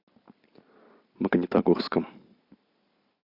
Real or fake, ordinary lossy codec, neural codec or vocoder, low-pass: real; MP3, 48 kbps; none; 5.4 kHz